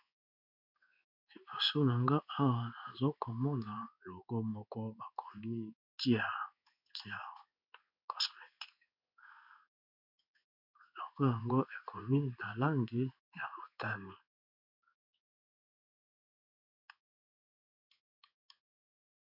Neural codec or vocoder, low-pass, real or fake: codec, 16 kHz in and 24 kHz out, 1 kbps, XY-Tokenizer; 5.4 kHz; fake